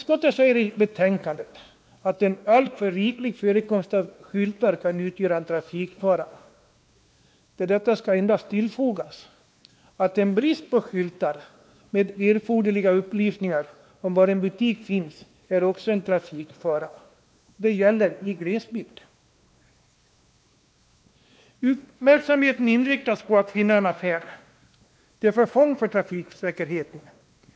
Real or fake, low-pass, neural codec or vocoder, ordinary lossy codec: fake; none; codec, 16 kHz, 2 kbps, X-Codec, WavLM features, trained on Multilingual LibriSpeech; none